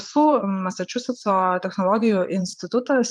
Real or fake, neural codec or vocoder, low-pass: fake; vocoder, 22.05 kHz, 80 mel bands, Vocos; 9.9 kHz